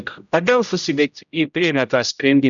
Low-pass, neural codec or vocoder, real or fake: 7.2 kHz; codec, 16 kHz, 0.5 kbps, X-Codec, HuBERT features, trained on general audio; fake